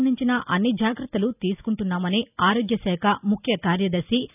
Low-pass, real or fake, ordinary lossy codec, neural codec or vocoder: 3.6 kHz; real; none; none